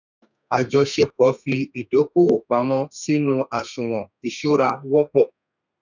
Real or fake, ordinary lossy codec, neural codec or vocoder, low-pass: fake; none; codec, 32 kHz, 1.9 kbps, SNAC; 7.2 kHz